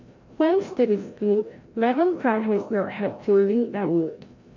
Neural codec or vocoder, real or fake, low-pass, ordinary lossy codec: codec, 16 kHz, 0.5 kbps, FreqCodec, larger model; fake; 7.2 kHz; MP3, 48 kbps